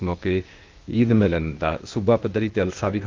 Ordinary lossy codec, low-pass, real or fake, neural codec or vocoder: Opus, 32 kbps; 7.2 kHz; fake; codec, 16 kHz, 0.8 kbps, ZipCodec